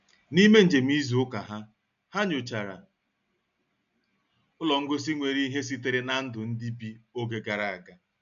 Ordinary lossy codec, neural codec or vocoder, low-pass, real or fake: none; none; 7.2 kHz; real